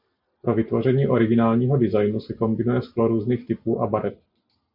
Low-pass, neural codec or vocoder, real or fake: 5.4 kHz; none; real